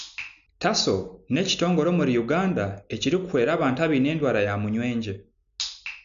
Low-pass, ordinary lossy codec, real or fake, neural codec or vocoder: 7.2 kHz; none; real; none